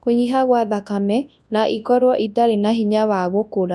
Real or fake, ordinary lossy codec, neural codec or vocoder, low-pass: fake; none; codec, 24 kHz, 0.9 kbps, WavTokenizer, large speech release; none